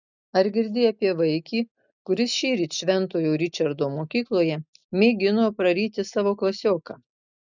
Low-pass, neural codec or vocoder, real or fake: 7.2 kHz; none; real